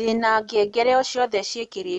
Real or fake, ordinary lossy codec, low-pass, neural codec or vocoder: real; none; 10.8 kHz; none